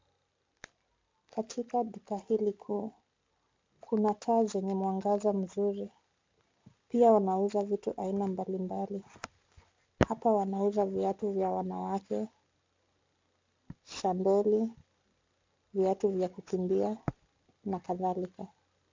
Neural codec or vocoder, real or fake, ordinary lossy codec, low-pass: none; real; MP3, 64 kbps; 7.2 kHz